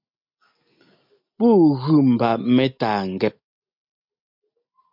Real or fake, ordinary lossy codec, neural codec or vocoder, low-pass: real; MP3, 48 kbps; none; 5.4 kHz